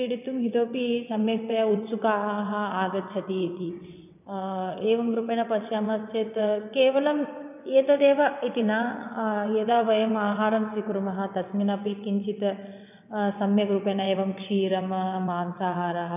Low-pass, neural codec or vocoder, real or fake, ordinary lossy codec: 3.6 kHz; vocoder, 22.05 kHz, 80 mel bands, WaveNeXt; fake; none